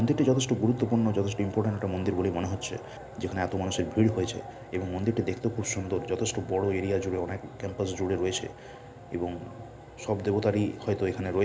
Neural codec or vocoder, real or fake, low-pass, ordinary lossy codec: none; real; none; none